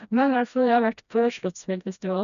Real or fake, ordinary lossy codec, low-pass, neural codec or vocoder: fake; none; 7.2 kHz; codec, 16 kHz, 1 kbps, FreqCodec, smaller model